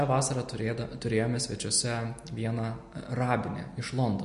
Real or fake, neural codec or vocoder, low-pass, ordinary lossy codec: real; none; 14.4 kHz; MP3, 48 kbps